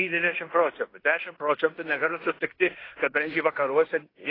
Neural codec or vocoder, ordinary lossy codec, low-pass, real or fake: codec, 16 kHz in and 24 kHz out, 0.9 kbps, LongCat-Audio-Codec, fine tuned four codebook decoder; AAC, 24 kbps; 5.4 kHz; fake